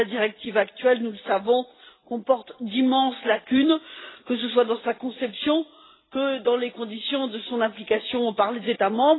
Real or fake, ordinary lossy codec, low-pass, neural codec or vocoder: real; AAC, 16 kbps; 7.2 kHz; none